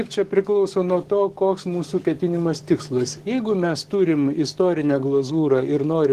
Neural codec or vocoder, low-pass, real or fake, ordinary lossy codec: codec, 44.1 kHz, 7.8 kbps, DAC; 14.4 kHz; fake; Opus, 16 kbps